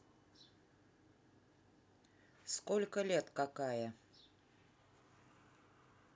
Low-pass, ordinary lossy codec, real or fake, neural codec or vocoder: none; none; real; none